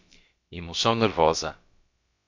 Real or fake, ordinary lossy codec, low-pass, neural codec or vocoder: fake; MP3, 48 kbps; 7.2 kHz; codec, 16 kHz, about 1 kbps, DyCAST, with the encoder's durations